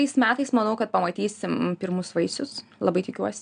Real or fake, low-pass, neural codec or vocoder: real; 9.9 kHz; none